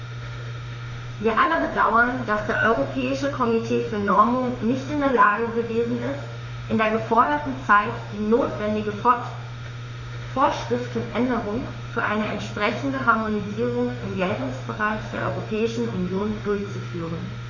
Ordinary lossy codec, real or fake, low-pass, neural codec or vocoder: none; fake; 7.2 kHz; autoencoder, 48 kHz, 32 numbers a frame, DAC-VAE, trained on Japanese speech